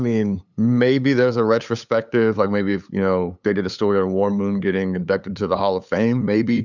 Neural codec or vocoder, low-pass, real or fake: codec, 16 kHz, 8 kbps, FunCodec, trained on LibriTTS, 25 frames a second; 7.2 kHz; fake